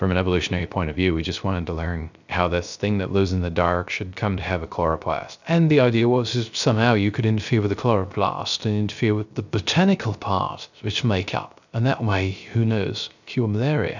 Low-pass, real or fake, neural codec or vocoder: 7.2 kHz; fake; codec, 16 kHz, 0.3 kbps, FocalCodec